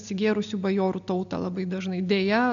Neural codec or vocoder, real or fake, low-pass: none; real; 7.2 kHz